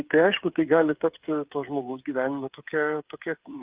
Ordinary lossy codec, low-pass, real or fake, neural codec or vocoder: Opus, 32 kbps; 3.6 kHz; fake; codec, 16 kHz, 8 kbps, FreqCodec, smaller model